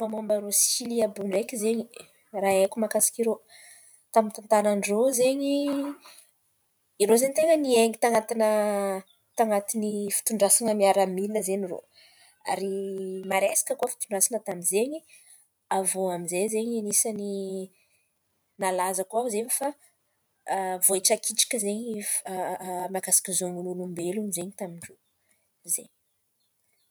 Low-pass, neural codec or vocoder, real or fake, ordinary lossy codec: none; vocoder, 44.1 kHz, 128 mel bands every 512 samples, BigVGAN v2; fake; none